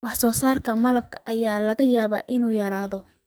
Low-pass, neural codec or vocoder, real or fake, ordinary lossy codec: none; codec, 44.1 kHz, 2.6 kbps, SNAC; fake; none